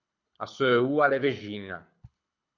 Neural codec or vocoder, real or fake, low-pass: codec, 24 kHz, 6 kbps, HILCodec; fake; 7.2 kHz